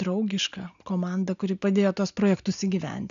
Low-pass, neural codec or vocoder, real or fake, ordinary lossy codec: 7.2 kHz; none; real; MP3, 96 kbps